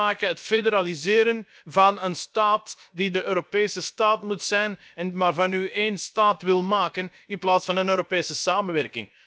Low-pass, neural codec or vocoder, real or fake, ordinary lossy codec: none; codec, 16 kHz, about 1 kbps, DyCAST, with the encoder's durations; fake; none